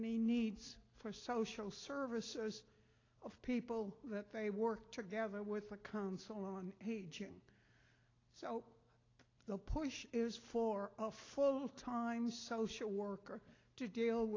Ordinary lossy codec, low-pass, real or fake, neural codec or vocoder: AAC, 32 kbps; 7.2 kHz; real; none